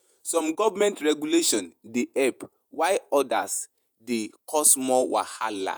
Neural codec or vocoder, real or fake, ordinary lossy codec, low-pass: vocoder, 48 kHz, 128 mel bands, Vocos; fake; none; none